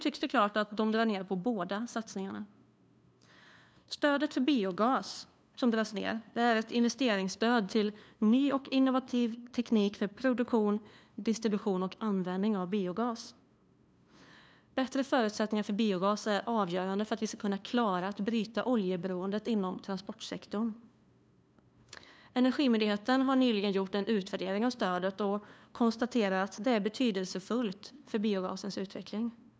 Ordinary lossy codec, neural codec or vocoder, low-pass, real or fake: none; codec, 16 kHz, 2 kbps, FunCodec, trained on LibriTTS, 25 frames a second; none; fake